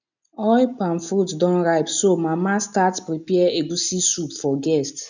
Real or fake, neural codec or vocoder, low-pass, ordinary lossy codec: real; none; 7.2 kHz; none